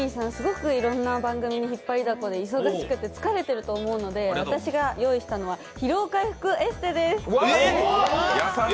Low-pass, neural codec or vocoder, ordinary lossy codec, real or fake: none; none; none; real